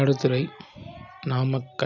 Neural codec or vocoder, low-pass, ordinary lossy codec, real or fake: none; 7.2 kHz; none; real